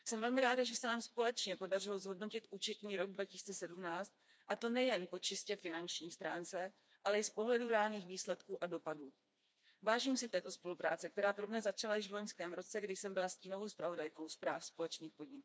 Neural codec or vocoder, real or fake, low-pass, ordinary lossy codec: codec, 16 kHz, 2 kbps, FreqCodec, smaller model; fake; none; none